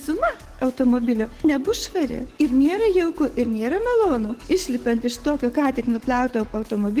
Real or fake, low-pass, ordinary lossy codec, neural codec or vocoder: fake; 14.4 kHz; Opus, 16 kbps; codec, 44.1 kHz, 7.8 kbps, DAC